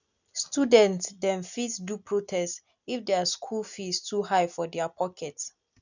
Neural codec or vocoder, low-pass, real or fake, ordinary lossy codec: none; 7.2 kHz; real; none